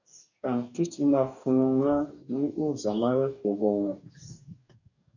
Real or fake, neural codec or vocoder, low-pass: fake; codec, 44.1 kHz, 2.6 kbps, DAC; 7.2 kHz